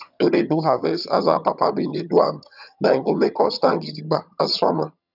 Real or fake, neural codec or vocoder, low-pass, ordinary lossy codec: fake; vocoder, 22.05 kHz, 80 mel bands, HiFi-GAN; 5.4 kHz; none